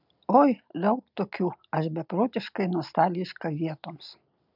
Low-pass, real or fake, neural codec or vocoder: 5.4 kHz; real; none